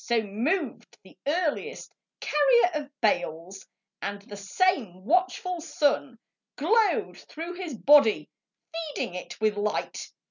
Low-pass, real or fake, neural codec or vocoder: 7.2 kHz; real; none